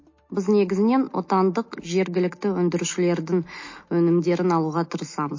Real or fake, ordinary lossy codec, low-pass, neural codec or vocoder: real; MP3, 32 kbps; 7.2 kHz; none